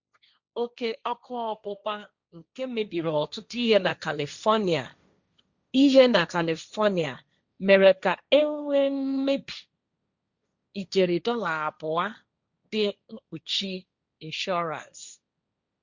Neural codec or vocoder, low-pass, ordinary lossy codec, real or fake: codec, 16 kHz, 1.1 kbps, Voila-Tokenizer; 7.2 kHz; Opus, 64 kbps; fake